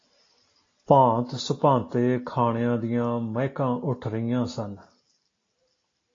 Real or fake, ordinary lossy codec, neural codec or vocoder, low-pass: real; AAC, 32 kbps; none; 7.2 kHz